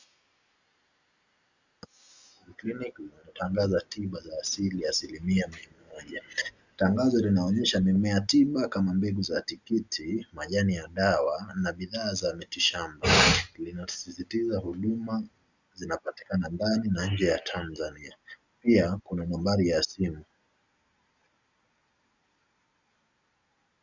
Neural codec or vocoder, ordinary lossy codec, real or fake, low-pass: none; Opus, 64 kbps; real; 7.2 kHz